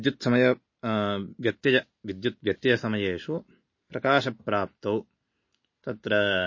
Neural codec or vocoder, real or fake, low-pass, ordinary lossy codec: none; real; 7.2 kHz; MP3, 32 kbps